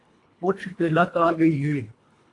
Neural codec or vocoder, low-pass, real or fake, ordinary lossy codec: codec, 24 kHz, 1.5 kbps, HILCodec; 10.8 kHz; fake; AAC, 48 kbps